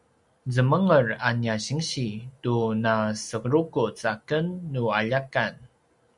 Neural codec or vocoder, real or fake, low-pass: none; real; 10.8 kHz